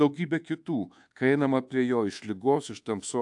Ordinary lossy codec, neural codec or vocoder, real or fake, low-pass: AAC, 64 kbps; codec, 24 kHz, 1.2 kbps, DualCodec; fake; 10.8 kHz